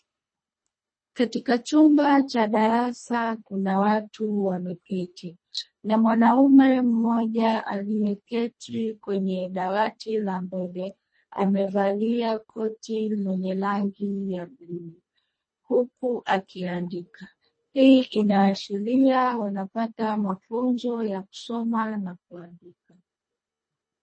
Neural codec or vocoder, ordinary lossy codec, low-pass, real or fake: codec, 24 kHz, 1.5 kbps, HILCodec; MP3, 32 kbps; 9.9 kHz; fake